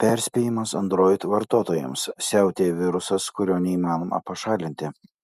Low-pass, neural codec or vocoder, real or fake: 14.4 kHz; none; real